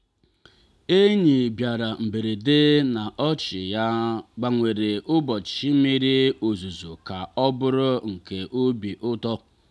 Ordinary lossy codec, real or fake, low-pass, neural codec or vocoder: none; real; none; none